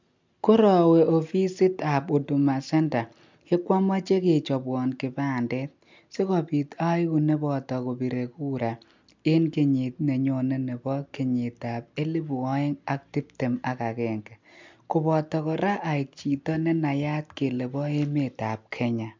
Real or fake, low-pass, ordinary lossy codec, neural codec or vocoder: real; 7.2 kHz; MP3, 64 kbps; none